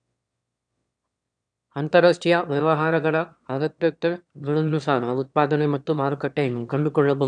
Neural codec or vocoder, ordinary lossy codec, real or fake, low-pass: autoencoder, 22.05 kHz, a latent of 192 numbers a frame, VITS, trained on one speaker; none; fake; 9.9 kHz